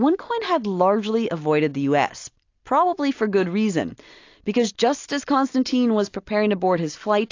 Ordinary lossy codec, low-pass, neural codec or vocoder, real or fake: AAC, 48 kbps; 7.2 kHz; none; real